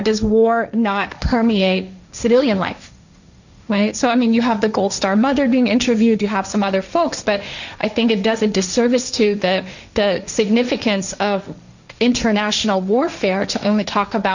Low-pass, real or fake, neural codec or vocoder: 7.2 kHz; fake; codec, 16 kHz, 1.1 kbps, Voila-Tokenizer